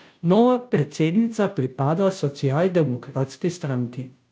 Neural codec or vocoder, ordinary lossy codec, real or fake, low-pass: codec, 16 kHz, 0.5 kbps, FunCodec, trained on Chinese and English, 25 frames a second; none; fake; none